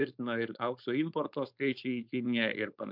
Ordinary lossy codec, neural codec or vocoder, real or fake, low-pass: MP3, 48 kbps; codec, 16 kHz, 4.8 kbps, FACodec; fake; 5.4 kHz